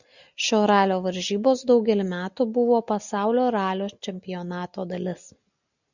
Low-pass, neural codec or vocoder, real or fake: 7.2 kHz; none; real